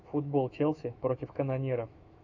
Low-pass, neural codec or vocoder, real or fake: 7.2 kHz; autoencoder, 48 kHz, 128 numbers a frame, DAC-VAE, trained on Japanese speech; fake